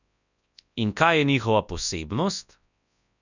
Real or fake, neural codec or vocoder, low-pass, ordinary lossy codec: fake; codec, 24 kHz, 0.9 kbps, WavTokenizer, large speech release; 7.2 kHz; none